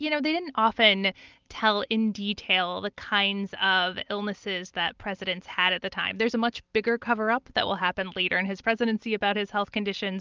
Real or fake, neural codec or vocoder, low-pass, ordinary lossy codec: real; none; 7.2 kHz; Opus, 24 kbps